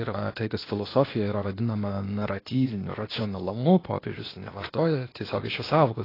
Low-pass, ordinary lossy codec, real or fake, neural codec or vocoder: 5.4 kHz; AAC, 24 kbps; fake; codec, 16 kHz, 0.8 kbps, ZipCodec